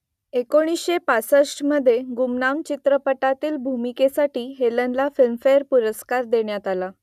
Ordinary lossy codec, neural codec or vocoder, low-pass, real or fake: none; none; 14.4 kHz; real